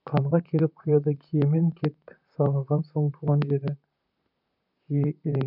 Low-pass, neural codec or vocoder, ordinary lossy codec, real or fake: 5.4 kHz; vocoder, 44.1 kHz, 128 mel bands, Pupu-Vocoder; none; fake